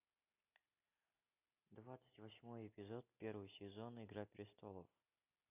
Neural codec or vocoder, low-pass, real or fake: none; 3.6 kHz; real